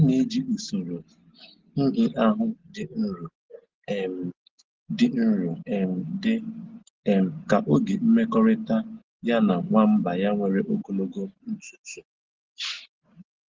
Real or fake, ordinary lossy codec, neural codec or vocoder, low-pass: real; Opus, 16 kbps; none; 7.2 kHz